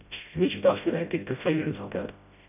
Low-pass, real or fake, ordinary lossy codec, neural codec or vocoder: 3.6 kHz; fake; none; codec, 16 kHz, 0.5 kbps, FreqCodec, smaller model